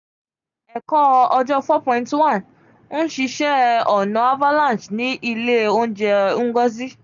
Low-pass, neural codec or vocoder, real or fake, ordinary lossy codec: 7.2 kHz; none; real; none